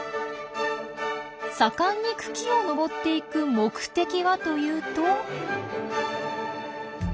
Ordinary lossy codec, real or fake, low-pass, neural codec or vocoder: none; real; none; none